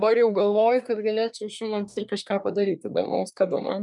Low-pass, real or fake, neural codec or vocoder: 10.8 kHz; fake; codec, 24 kHz, 1 kbps, SNAC